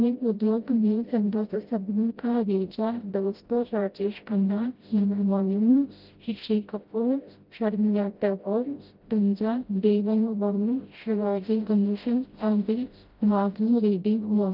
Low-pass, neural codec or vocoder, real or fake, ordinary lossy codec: 5.4 kHz; codec, 16 kHz, 0.5 kbps, FreqCodec, smaller model; fake; Opus, 32 kbps